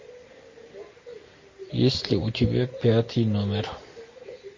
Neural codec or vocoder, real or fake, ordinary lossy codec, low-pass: none; real; MP3, 32 kbps; 7.2 kHz